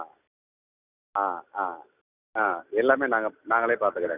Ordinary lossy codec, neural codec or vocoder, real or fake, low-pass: none; none; real; 3.6 kHz